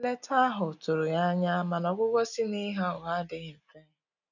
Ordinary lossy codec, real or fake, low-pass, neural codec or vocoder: none; real; 7.2 kHz; none